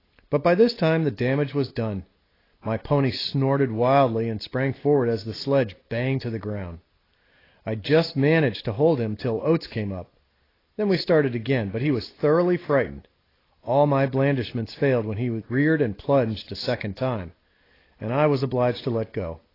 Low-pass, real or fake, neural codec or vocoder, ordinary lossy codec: 5.4 kHz; real; none; AAC, 24 kbps